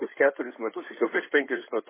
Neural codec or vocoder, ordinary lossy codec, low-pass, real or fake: codec, 16 kHz in and 24 kHz out, 2.2 kbps, FireRedTTS-2 codec; MP3, 16 kbps; 3.6 kHz; fake